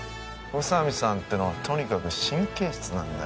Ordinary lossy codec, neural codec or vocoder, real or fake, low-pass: none; none; real; none